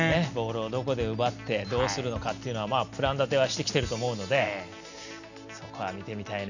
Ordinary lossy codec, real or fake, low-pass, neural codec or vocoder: none; real; 7.2 kHz; none